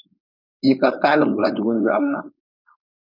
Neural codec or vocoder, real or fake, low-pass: codec, 16 kHz, 4.8 kbps, FACodec; fake; 5.4 kHz